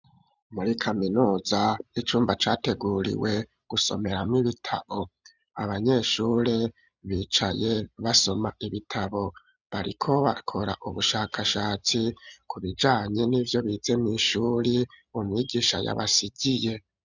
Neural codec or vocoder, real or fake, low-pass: none; real; 7.2 kHz